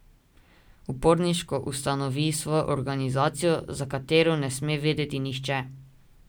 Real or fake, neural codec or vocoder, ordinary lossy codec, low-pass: real; none; none; none